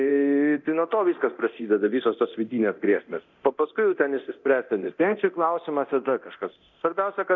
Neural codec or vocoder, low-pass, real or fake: codec, 24 kHz, 0.9 kbps, DualCodec; 7.2 kHz; fake